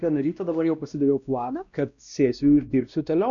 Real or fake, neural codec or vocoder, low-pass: fake; codec, 16 kHz, 1 kbps, X-Codec, WavLM features, trained on Multilingual LibriSpeech; 7.2 kHz